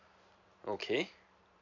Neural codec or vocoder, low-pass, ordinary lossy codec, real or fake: vocoder, 44.1 kHz, 128 mel bands every 256 samples, BigVGAN v2; 7.2 kHz; MP3, 64 kbps; fake